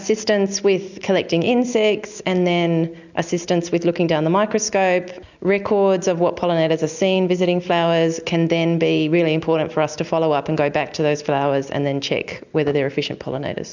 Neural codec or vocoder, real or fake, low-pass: none; real; 7.2 kHz